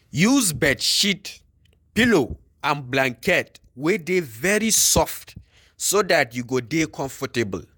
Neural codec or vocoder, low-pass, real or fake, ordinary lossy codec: vocoder, 48 kHz, 128 mel bands, Vocos; none; fake; none